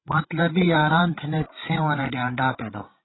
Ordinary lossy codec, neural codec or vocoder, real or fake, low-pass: AAC, 16 kbps; codec, 16 kHz, 16 kbps, FreqCodec, larger model; fake; 7.2 kHz